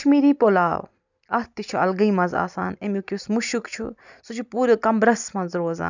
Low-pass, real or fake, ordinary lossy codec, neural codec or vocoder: 7.2 kHz; real; none; none